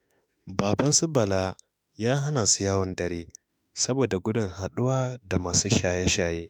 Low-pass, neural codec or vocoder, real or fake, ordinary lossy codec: none; autoencoder, 48 kHz, 32 numbers a frame, DAC-VAE, trained on Japanese speech; fake; none